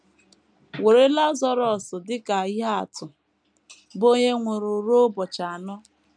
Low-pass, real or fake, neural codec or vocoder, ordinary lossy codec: 9.9 kHz; real; none; none